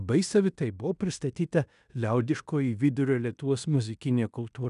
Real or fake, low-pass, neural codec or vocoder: fake; 10.8 kHz; codec, 16 kHz in and 24 kHz out, 0.9 kbps, LongCat-Audio-Codec, fine tuned four codebook decoder